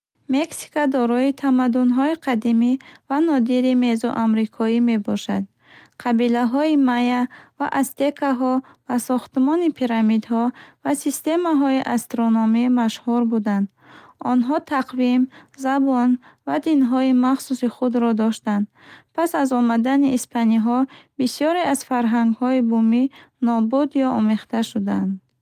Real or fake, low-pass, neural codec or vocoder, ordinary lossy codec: real; 14.4 kHz; none; Opus, 32 kbps